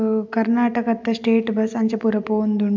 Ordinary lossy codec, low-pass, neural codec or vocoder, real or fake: MP3, 64 kbps; 7.2 kHz; none; real